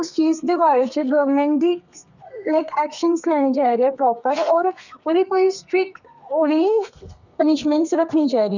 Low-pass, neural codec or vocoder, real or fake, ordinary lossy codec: 7.2 kHz; codec, 32 kHz, 1.9 kbps, SNAC; fake; none